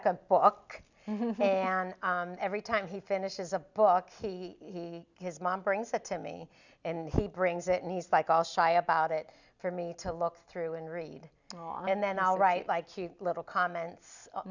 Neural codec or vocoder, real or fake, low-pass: none; real; 7.2 kHz